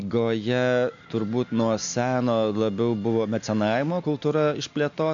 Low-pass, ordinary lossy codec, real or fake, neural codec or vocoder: 7.2 kHz; MP3, 64 kbps; real; none